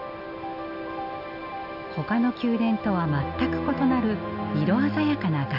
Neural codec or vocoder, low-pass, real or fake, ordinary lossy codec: none; 5.4 kHz; real; none